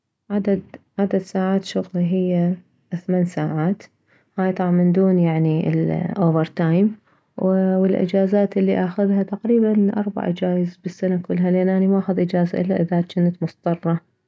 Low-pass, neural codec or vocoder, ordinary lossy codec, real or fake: none; none; none; real